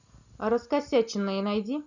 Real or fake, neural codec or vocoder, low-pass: real; none; 7.2 kHz